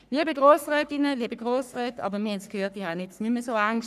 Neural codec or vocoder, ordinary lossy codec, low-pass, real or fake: codec, 44.1 kHz, 3.4 kbps, Pupu-Codec; none; 14.4 kHz; fake